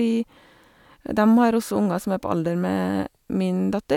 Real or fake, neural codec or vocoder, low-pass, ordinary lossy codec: real; none; 19.8 kHz; none